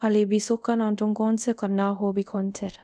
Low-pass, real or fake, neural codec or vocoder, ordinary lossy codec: none; fake; codec, 24 kHz, 0.5 kbps, DualCodec; none